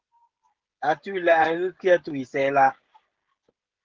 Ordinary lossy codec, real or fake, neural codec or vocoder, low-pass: Opus, 16 kbps; fake; codec, 16 kHz, 16 kbps, FreqCodec, smaller model; 7.2 kHz